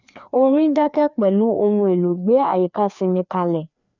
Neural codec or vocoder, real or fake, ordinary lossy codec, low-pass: codec, 16 kHz, 2 kbps, FreqCodec, larger model; fake; none; 7.2 kHz